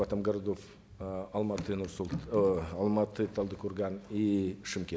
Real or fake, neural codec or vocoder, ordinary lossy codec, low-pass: real; none; none; none